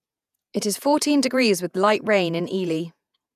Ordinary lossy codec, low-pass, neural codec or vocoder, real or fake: none; 14.4 kHz; vocoder, 48 kHz, 128 mel bands, Vocos; fake